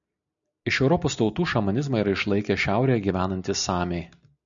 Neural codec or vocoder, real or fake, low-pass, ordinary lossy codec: none; real; 7.2 kHz; MP3, 96 kbps